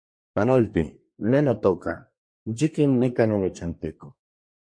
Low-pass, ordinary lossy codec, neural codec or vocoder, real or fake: 9.9 kHz; MP3, 48 kbps; codec, 24 kHz, 1 kbps, SNAC; fake